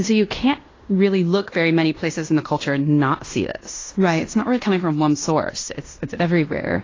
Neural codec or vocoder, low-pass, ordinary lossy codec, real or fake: codec, 16 kHz in and 24 kHz out, 0.9 kbps, LongCat-Audio-Codec, fine tuned four codebook decoder; 7.2 kHz; AAC, 32 kbps; fake